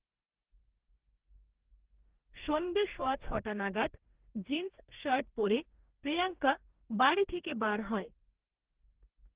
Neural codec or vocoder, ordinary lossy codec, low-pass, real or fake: codec, 44.1 kHz, 2.6 kbps, DAC; Opus, 16 kbps; 3.6 kHz; fake